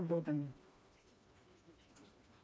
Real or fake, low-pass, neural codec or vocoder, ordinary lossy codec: fake; none; codec, 16 kHz, 2 kbps, FreqCodec, smaller model; none